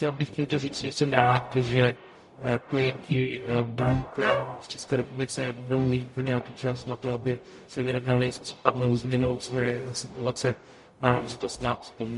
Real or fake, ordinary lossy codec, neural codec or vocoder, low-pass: fake; MP3, 48 kbps; codec, 44.1 kHz, 0.9 kbps, DAC; 14.4 kHz